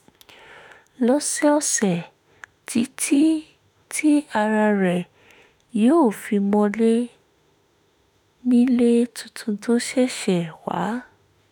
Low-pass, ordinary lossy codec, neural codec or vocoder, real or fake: none; none; autoencoder, 48 kHz, 32 numbers a frame, DAC-VAE, trained on Japanese speech; fake